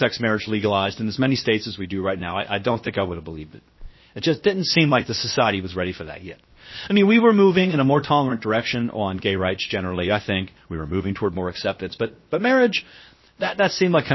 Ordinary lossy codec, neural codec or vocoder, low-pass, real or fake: MP3, 24 kbps; codec, 16 kHz, 0.7 kbps, FocalCodec; 7.2 kHz; fake